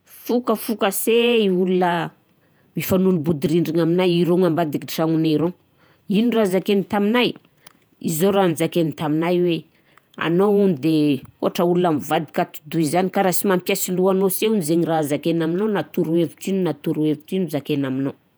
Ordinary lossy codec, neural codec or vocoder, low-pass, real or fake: none; vocoder, 48 kHz, 128 mel bands, Vocos; none; fake